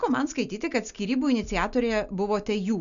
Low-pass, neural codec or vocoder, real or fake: 7.2 kHz; none; real